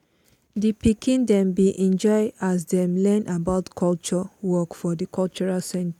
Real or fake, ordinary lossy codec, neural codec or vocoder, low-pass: real; none; none; 19.8 kHz